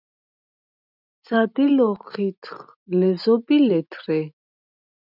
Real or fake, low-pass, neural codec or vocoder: real; 5.4 kHz; none